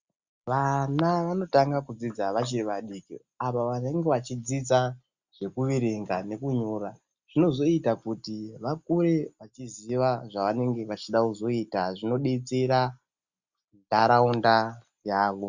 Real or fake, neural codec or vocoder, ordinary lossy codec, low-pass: real; none; Opus, 64 kbps; 7.2 kHz